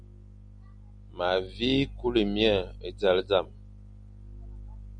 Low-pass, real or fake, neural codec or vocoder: 9.9 kHz; real; none